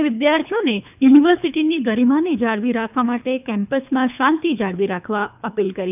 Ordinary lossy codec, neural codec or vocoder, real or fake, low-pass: none; codec, 24 kHz, 3 kbps, HILCodec; fake; 3.6 kHz